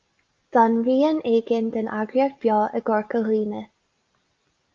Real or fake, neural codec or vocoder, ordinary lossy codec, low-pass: real; none; Opus, 24 kbps; 7.2 kHz